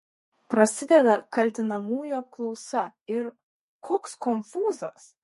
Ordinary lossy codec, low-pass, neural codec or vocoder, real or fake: MP3, 48 kbps; 14.4 kHz; codec, 32 kHz, 1.9 kbps, SNAC; fake